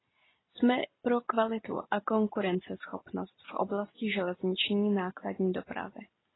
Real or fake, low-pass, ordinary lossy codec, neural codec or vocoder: real; 7.2 kHz; AAC, 16 kbps; none